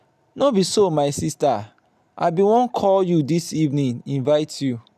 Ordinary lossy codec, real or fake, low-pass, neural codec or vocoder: none; real; 14.4 kHz; none